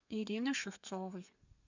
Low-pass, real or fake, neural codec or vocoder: 7.2 kHz; fake; codec, 32 kHz, 1.9 kbps, SNAC